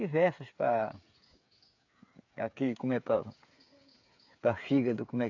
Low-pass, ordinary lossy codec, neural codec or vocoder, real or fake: 7.2 kHz; AAC, 48 kbps; codec, 16 kHz, 8 kbps, FreqCodec, smaller model; fake